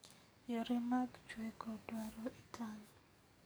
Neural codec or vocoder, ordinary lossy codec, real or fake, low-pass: codec, 44.1 kHz, 7.8 kbps, DAC; none; fake; none